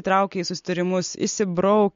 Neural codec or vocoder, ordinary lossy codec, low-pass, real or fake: none; MP3, 48 kbps; 7.2 kHz; real